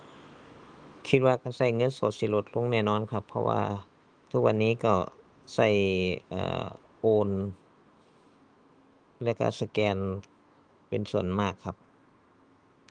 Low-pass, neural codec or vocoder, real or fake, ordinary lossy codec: 9.9 kHz; autoencoder, 48 kHz, 128 numbers a frame, DAC-VAE, trained on Japanese speech; fake; Opus, 32 kbps